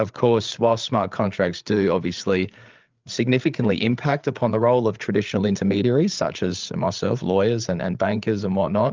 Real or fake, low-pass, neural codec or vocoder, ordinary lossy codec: fake; 7.2 kHz; codec, 16 kHz, 8 kbps, FreqCodec, larger model; Opus, 32 kbps